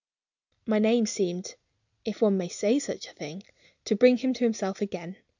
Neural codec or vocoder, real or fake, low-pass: none; real; 7.2 kHz